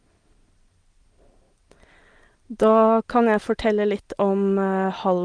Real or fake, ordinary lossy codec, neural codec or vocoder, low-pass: real; Opus, 24 kbps; none; 9.9 kHz